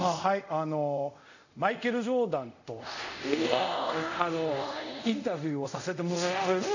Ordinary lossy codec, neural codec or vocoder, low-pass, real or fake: none; codec, 24 kHz, 0.5 kbps, DualCodec; 7.2 kHz; fake